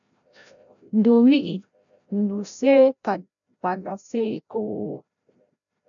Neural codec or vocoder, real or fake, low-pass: codec, 16 kHz, 0.5 kbps, FreqCodec, larger model; fake; 7.2 kHz